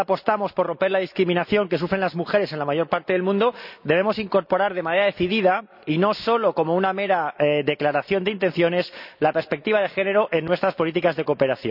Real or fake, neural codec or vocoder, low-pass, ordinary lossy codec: real; none; 5.4 kHz; none